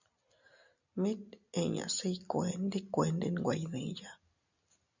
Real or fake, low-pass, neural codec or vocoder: real; 7.2 kHz; none